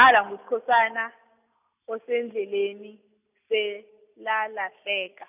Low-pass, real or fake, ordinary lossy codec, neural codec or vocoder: 3.6 kHz; real; none; none